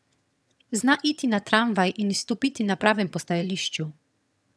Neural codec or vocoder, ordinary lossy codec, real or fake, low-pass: vocoder, 22.05 kHz, 80 mel bands, HiFi-GAN; none; fake; none